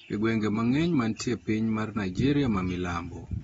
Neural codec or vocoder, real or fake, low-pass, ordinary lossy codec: none; real; 19.8 kHz; AAC, 24 kbps